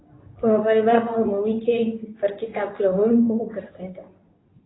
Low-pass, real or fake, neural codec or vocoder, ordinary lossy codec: 7.2 kHz; fake; codec, 24 kHz, 0.9 kbps, WavTokenizer, medium speech release version 2; AAC, 16 kbps